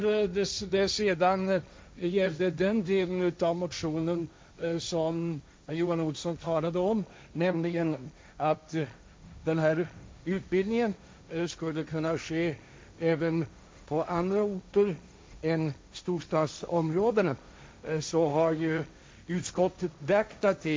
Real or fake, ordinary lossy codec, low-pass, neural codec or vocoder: fake; none; none; codec, 16 kHz, 1.1 kbps, Voila-Tokenizer